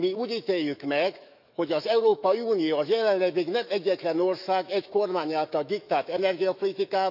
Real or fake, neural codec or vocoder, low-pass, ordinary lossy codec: fake; autoencoder, 48 kHz, 128 numbers a frame, DAC-VAE, trained on Japanese speech; 5.4 kHz; none